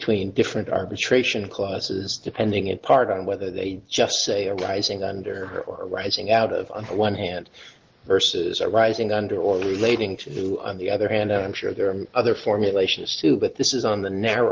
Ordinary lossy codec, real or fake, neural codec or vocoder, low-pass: Opus, 16 kbps; real; none; 7.2 kHz